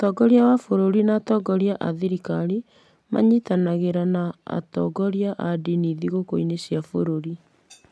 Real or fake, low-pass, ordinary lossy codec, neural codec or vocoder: real; none; none; none